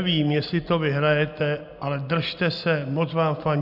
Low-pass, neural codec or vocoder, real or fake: 5.4 kHz; none; real